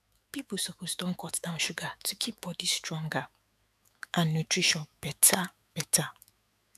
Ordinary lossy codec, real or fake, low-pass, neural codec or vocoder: none; fake; 14.4 kHz; autoencoder, 48 kHz, 128 numbers a frame, DAC-VAE, trained on Japanese speech